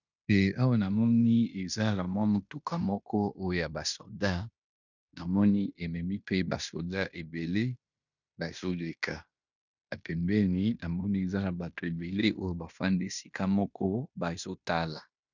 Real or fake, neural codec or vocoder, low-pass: fake; codec, 16 kHz in and 24 kHz out, 0.9 kbps, LongCat-Audio-Codec, fine tuned four codebook decoder; 7.2 kHz